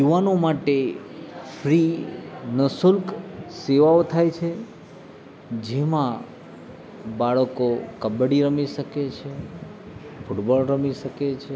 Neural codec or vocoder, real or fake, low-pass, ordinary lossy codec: none; real; none; none